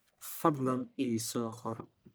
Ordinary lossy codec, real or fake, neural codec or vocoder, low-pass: none; fake; codec, 44.1 kHz, 1.7 kbps, Pupu-Codec; none